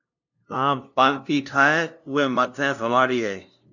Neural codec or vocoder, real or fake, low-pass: codec, 16 kHz, 0.5 kbps, FunCodec, trained on LibriTTS, 25 frames a second; fake; 7.2 kHz